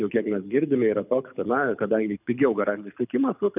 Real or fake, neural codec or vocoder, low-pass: fake; codec, 24 kHz, 6 kbps, HILCodec; 3.6 kHz